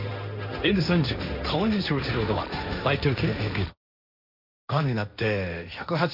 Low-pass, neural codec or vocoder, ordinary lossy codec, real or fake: 5.4 kHz; codec, 16 kHz, 1.1 kbps, Voila-Tokenizer; none; fake